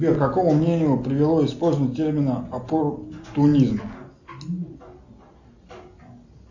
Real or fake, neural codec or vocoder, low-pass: real; none; 7.2 kHz